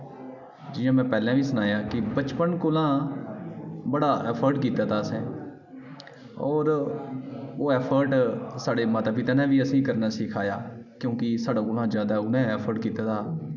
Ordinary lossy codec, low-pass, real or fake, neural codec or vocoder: none; 7.2 kHz; real; none